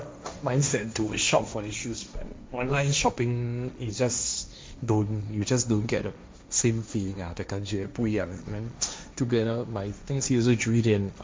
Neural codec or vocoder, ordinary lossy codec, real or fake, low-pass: codec, 16 kHz, 1.1 kbps, Voila-Tokenizer; none; fake; none